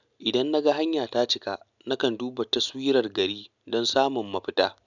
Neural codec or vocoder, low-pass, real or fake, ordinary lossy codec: none; 7.2 kHz; real; none